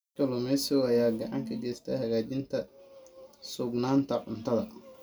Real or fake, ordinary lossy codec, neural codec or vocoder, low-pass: real; none; none; none